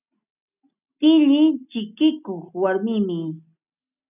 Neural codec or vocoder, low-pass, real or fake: none; 3.6 kHz; real